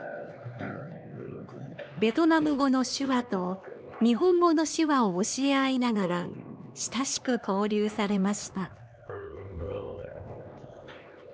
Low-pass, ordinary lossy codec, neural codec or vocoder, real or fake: none; none; codec, 16 kHz, 2 kbps, X-Codec, HuBERT features, trained on LibriSpeech; fake